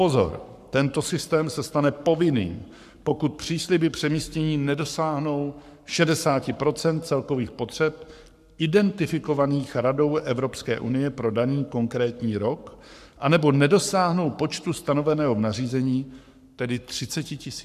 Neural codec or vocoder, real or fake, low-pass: codec, 44.1 kHz, 7.8 kbps, Pupu-Codec; fake; 14.4 kHz